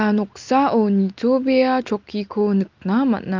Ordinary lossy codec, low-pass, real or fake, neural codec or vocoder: Opus, 24 kbps; 7.2 kHz; real; none